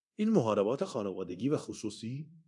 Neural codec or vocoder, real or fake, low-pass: codec, 24 kHz, 0.9 kbps, DualCodec; fake; 10.8 kHz